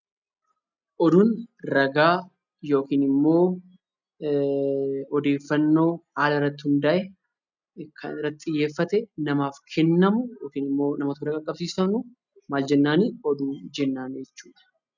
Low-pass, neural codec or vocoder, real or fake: 7.2 kHz; none; real